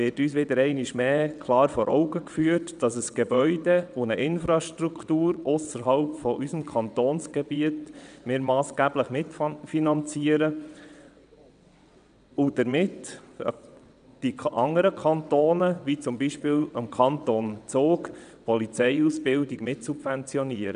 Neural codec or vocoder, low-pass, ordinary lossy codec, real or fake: vocoder, 22.05 kHz, 80 mel bands, WaveNeXt; 9.9 kHz; none; fake